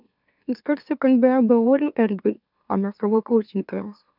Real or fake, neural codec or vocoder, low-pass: fake; autoencoder, 44.1 kHz, a latent of 192 numbers a frame, MeloTTS; 5.4 kHz